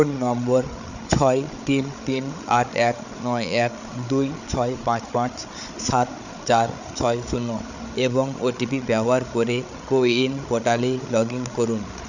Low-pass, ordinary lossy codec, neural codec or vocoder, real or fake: 7.2 kHz; none; codec, 16 kHz, 8 kbps, FreqCodec, larger model; fake